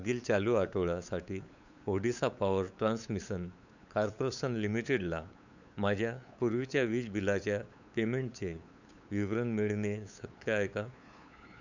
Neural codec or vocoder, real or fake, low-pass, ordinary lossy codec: codec, 16 kHz, 8 kbps, FunCodec, trained on LibriTTS, 25 frames a second; fake; 7.2 kHz; none